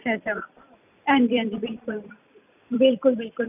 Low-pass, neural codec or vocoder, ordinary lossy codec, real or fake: 3.6 kHz; vocoder, 44.1 kHz, 128 mel bands every 256 samples, BigVGAN v2; none; fake